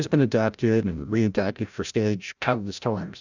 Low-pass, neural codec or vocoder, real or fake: 7.2 kHz; codec, 16 kHz, 0.5 kbps, FreqCodec, larger model; fake